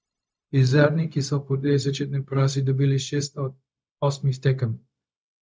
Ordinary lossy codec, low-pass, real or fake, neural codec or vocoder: none; none; fake; codec, 16 kHz, 0.4 kbps, LongCat-Audio-Codec